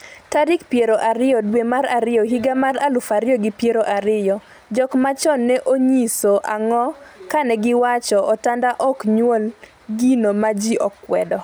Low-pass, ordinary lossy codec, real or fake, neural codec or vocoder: none; none; real; none